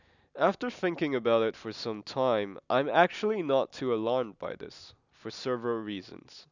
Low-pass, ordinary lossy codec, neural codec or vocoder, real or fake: 7.2 kHz; none; none; real